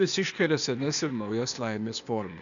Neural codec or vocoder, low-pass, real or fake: codec, 16 kHz, 0.8 kbps, ZipCodec; 7.2 kHz; fake